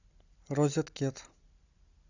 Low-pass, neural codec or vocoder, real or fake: 7.2 kHz; none; real